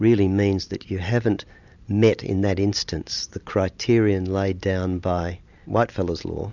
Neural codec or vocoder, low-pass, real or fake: none; 7.2 kHz; real